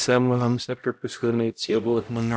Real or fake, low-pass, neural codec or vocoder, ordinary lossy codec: fake; none; codec, 16 kHz, 0.5 kbps, X-Codec, HuBERT features, trained on LibriSpeech; none